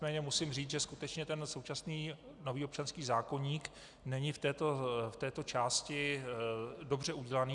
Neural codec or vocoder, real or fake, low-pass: none; real; 10.8 kHz